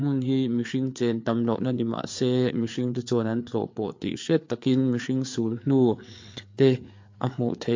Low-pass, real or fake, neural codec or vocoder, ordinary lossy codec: 7.2 kHz; fake; codec, 16 kHz, 4 kbps, FreqCodec, larger model; MP3, 48 kbps